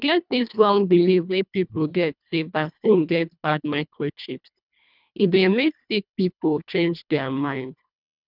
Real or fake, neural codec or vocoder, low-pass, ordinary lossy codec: fake; codec, 24 kHz, 1.5 kbps, HILCodec; 5.4 kHz; none